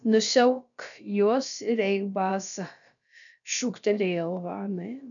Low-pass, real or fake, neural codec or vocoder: 7.2 kHz; fake; codec, 16 kHz, about 1 kbps, DyCAST, with the encoder's durations